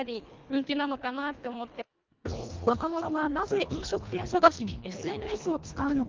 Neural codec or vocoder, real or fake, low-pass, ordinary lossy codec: codec, 24 kHz, 1.5 kbps, HILCodec; fake; 7.2 kHz; Opus, 32 kbps